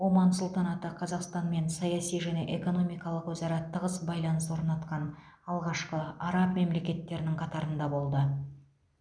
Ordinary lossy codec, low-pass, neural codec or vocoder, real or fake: none; 9.9 kHz; none; real